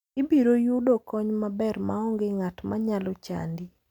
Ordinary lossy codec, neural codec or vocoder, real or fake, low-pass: none; none; real; 19.8 kHz